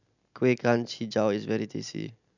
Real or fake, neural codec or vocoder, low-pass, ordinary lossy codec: real; none; 7.2 kHz; none